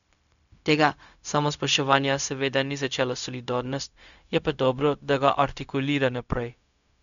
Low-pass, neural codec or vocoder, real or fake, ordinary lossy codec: 7.2 kHz; codec, 16 kHz, 0.4 kbps, LongCat-Audio-Codec; fake; none